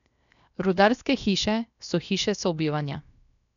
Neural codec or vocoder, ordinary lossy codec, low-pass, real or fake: codec, 16 kHz, 0.7 kbps, FocalCodec; none; 7.2 kHz; fake